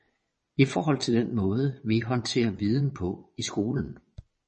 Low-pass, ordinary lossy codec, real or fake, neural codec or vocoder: 9.9 kHz; MP3, 32 kbps; fake; vocoder, 22.05 kHz, 80 mel bands, WaveNeXt